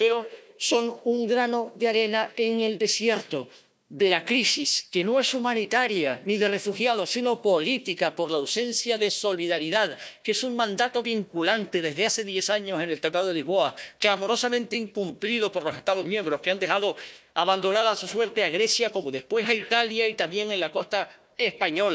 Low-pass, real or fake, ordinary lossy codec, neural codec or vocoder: none; fake; none; codec, 16 kHz, 1 kbps, FunCodec, trained on Chinese and English, 50 frames a second